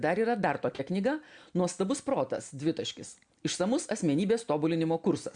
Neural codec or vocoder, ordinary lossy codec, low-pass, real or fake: none; MP3, 64 kbps; 9.9 kHz; real